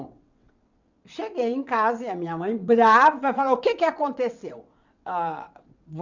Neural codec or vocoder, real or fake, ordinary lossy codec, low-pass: vocoder, 22.05 kHz, 80 mel bands, WaveNeXt; fake; none; 7.2 kHz